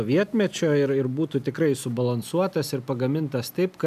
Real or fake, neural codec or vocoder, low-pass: real; none; 14.4 kHz